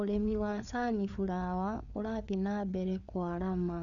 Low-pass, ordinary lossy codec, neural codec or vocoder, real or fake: 7.2 kHz; none; codec, 16 kHz, 4 kbps, FreqCodec, larger model; fake